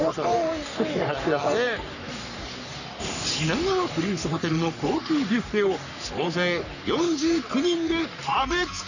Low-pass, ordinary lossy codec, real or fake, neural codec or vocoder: 7.2 kHz; none; fake; codec, 44.1 kHz, 3.4 kbps, Pupu-Codec